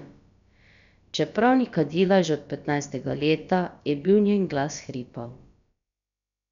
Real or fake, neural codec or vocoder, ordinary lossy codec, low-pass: fake; codec, 16 kHz, about 1 kbps, DyCAST, with the encoder's durations; none; 7.2 kHz